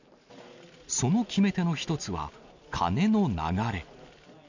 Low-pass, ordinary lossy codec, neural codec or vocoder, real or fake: 7.2 kHz; none; none; real